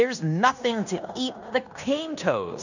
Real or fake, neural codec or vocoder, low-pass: fake; codec, 16 kHz in and 24 kHz out, 0.9 kbps, LongCat-Audio-Codec, fine tuned four codebook decoder; 7.2 kHz